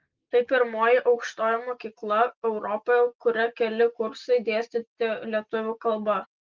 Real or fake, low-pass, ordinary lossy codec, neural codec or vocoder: real; 7.2 kHz; Opus, 32 kbps; none